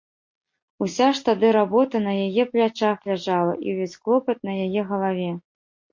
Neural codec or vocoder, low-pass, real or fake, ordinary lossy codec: none; 7.2 kHz; real; MP3, 48 kbps